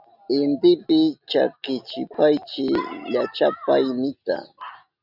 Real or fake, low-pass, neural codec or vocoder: real; 5.4 kHz; none